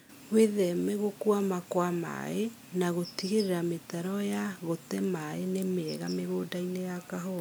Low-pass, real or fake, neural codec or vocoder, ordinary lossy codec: none; real; none; none